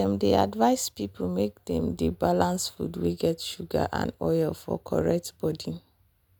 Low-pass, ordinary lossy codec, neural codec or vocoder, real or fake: none; none; none; real